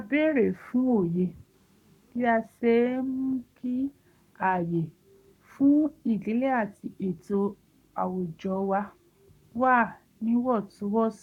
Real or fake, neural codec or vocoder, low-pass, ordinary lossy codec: fake; codec, 44.1 kHz, 7.8 kbps, Pupu-Codec; 19.8 kHz; none